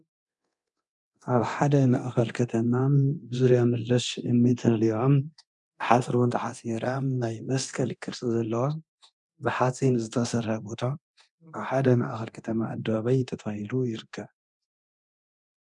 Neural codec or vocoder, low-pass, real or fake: codec, 24 kHz, 0.9 kbps, DualCodec; 10.8 kHz; fake